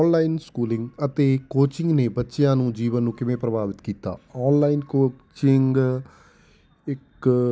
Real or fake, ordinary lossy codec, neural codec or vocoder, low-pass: real; none; none; none